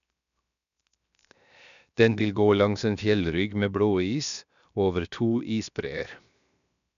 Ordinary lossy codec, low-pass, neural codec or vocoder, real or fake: none; 7.2 kHz; codec, 16 kHz, 0.7 kbps, FocalCodec; fake